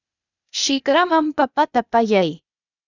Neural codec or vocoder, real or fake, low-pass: codec, 16 kHz, 0.8 kbps, ZipCodec; fake; 7.2 kHz